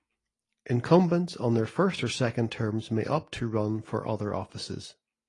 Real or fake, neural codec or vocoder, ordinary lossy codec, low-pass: real; none; AAC, 32 kbps; 10.8 kHz